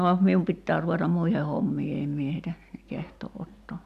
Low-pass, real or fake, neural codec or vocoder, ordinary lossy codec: 14.4 kHz; real; none; MP3, 96 kbps